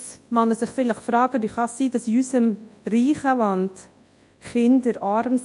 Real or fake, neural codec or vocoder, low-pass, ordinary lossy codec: fake; codec, 24 kHz, 0.9 kbps, WavTokenizer, large speech release; 10.8 kHz; AAC, 64 kbps